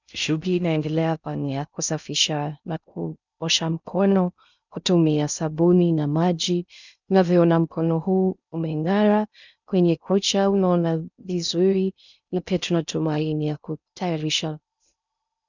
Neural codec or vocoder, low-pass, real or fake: codec, 16 kHz in and 24 kHz out, 0.6 kbps, FocalCodec, streaming, 2048 codes; 7.2 kHz; fake